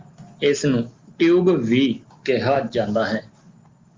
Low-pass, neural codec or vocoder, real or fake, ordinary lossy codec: 7.2 kHz; none; real; Opus, 32 kbps